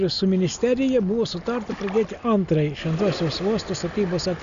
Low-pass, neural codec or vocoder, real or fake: 7.2 kHz; none; real